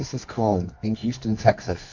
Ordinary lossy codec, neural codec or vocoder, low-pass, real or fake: AAC, 48 kbps; codec, 24 kHz, 0.9 kbps, WavTokenizer, medium music audio release; 7.2 kHz; fake